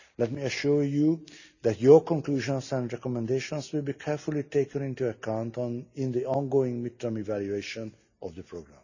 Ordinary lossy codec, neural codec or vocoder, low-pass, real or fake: none; none; 7.2 kHz; real